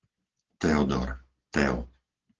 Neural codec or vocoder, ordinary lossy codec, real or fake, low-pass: none; Opus, 16 kbps; real; 7.2 kHz